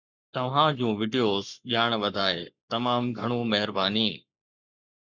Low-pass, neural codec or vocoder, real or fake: 7.2 kHz; codec, 44.1 kHz, 7.8 kbps, Pupu-Codec; fake